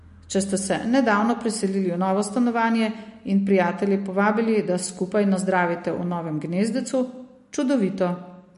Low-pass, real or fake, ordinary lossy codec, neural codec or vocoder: 10.8 kHz; real; MP3, 48 kbps; none